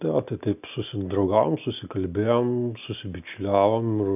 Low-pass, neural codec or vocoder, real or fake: 3.6 kHz; none; real